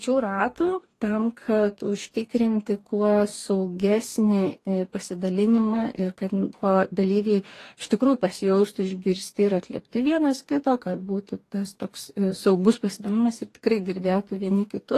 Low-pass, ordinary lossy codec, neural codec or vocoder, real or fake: 14.4 kHz; AAC, 48 kbps; codec, 44.1 kHz, 2.6 kbps, DAC; fake